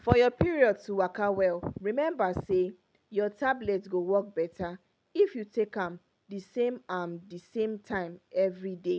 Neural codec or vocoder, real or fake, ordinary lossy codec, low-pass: none; real; none; none